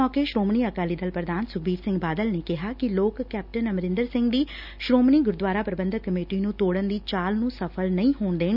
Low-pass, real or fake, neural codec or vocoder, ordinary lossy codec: 5.4 kHz; real; none; none